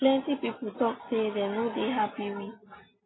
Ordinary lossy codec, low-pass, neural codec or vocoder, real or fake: AAC, 16 kbps; 7.2 kHz; none; real